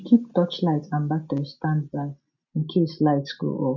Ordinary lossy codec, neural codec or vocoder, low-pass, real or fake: none; none; 7.2 kHz; real